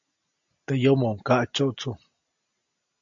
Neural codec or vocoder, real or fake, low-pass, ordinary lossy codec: none; real; 7.2 kHz; AAC, 64 kbps